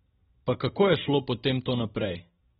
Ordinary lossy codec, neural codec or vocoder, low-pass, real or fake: AAC, 16 kbps; none; 10.8 kHz; real